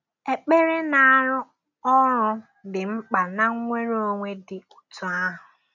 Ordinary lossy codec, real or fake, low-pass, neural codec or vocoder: none; real; 7.2 kHz; none